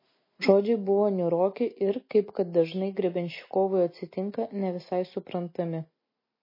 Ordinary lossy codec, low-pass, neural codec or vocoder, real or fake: MP3, 24 kbps; 5.4 kHz; none; real